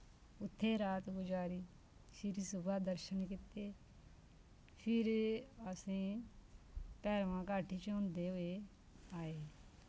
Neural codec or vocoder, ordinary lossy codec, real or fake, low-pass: none; none; real; none